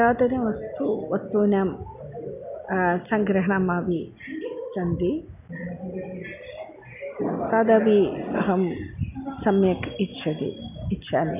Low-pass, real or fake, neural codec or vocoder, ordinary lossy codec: 3.6 kHz; real; none; none